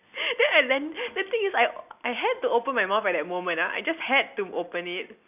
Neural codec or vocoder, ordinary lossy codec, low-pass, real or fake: none; none; 3.6 kHz; real